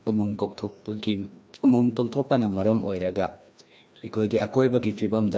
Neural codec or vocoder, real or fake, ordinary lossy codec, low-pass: codec, 16 kHz, 1 kbps, FreqCodec, larger model; fake; none; none